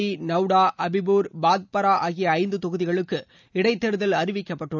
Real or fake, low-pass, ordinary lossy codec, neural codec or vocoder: real; 7.2 kHz; none; none